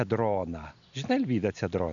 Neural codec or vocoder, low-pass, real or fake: none; 7.2 kHz; real